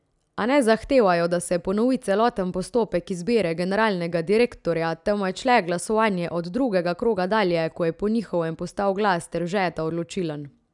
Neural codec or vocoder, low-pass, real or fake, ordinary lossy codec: none; 10.8 kHz; real; none